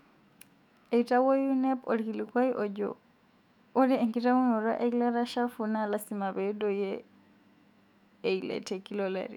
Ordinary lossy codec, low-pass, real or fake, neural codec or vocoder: none; 19.8 kHz; fake; autoencoder, 48 kHz, 128 numbers a frame, DAC-VAE, trained on Japanese speech